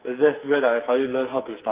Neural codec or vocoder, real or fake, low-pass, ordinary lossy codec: codec, 44.1 kHz, 2.6 kbps, SNAC; fake; 3.6 kHz; Opus, 24 kbps